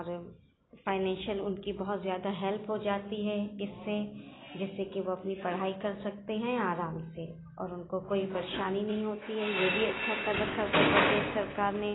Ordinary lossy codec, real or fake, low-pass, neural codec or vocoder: AAC, 16 kbps; real; 7.2 kHz; none